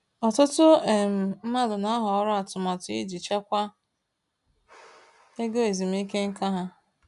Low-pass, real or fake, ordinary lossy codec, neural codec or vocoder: 10.8 kHz; real; none; none